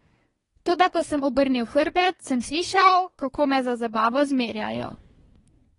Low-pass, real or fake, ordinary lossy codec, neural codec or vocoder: 10.8 kHz; fake; AAC, 32 kbps; codec, 24 kHz, 1 kbps, SNAC